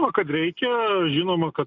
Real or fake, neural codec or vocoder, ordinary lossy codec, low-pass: real; none; Opus, 64 kbps; 7.2 kHz